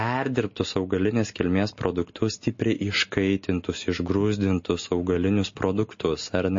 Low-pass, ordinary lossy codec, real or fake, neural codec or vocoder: 7.2 kHz; MP3, 32 kbps; real; none